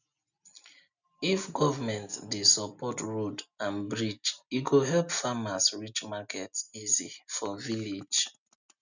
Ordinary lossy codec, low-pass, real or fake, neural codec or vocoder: none; 7.2 kHz; real; none